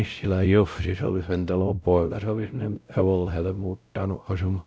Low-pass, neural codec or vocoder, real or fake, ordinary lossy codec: none; codec, 16 kHz, 0.5 kbps, X-Codec, WavLM features, trained on Multilingual LibriSpeech; fake; none